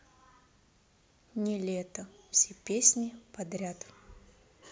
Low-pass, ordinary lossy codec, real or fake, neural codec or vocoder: none; none; real; none